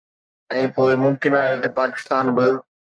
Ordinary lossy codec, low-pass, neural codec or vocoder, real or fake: MP3, 96 kbps; 9.9 kHz; codec, 44.1 kHz, 1.7 kbps, Pupu-Codec; fake